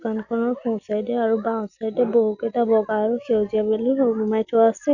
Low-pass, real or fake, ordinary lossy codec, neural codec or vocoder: 7.2 kHz; real; none; none